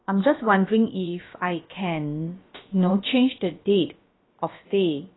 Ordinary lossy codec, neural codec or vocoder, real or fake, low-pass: AAC, 16 kbps; codec, 16 kHz, about 1 kbps, DyCAST, with the encoder's durations; fake; 7.2 kHz